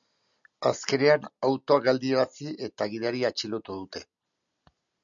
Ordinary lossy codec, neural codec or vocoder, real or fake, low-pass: MP3, 96 kbps; none; real; 7.2 kHz